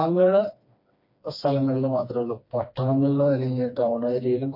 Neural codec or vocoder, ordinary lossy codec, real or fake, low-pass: codec, 16 kHz, 2 kbps, FreqCodec, smaller model; MP3, 32 kbps; fake; 5.4 kHz